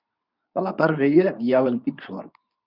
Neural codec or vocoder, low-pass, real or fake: codec, 24 kHz, 0.9 kbps, WavTokenizer, medium speech release version 2; 5.4 kHz; fake